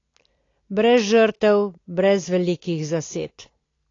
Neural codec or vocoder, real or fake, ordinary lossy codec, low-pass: none; real; AAC, 32 kbps; 7.2 kHz